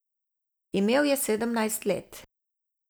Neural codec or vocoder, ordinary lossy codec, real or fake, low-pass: none; none; real; none